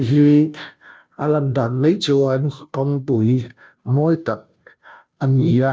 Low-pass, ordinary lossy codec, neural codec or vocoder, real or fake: none; none; codec, 16 kHz, 0.5 kbps, FunCodec, trained on Chinese and English, 25 frames a second; fake